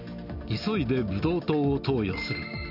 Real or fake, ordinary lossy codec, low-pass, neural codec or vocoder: real; none; 5.4 kHz; none